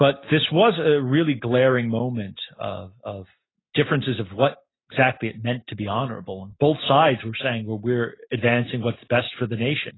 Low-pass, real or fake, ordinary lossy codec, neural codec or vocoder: 7.2 kHz; real; AAC, 16 kbps; none